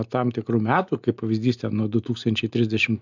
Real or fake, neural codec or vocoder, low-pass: real; none; 7.2 kHz